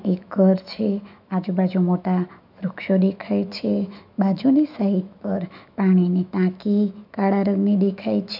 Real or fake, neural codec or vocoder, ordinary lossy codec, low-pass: fake; autoencoder, 48 kHz, 128 numbers a frame, DAC-VAE, trained on Japanese speech; none; 5.4 kHz